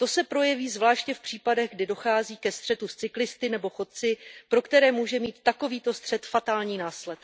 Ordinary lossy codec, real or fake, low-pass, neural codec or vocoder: none; real; none; none